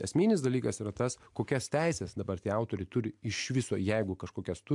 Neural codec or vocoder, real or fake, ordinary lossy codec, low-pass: none; real; MP3, 64 kbps; 10.8 kHz